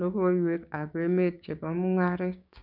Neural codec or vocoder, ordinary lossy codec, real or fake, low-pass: codec, 16 kHz, 6 kbps, DAC; none; fake; 5.4 kHz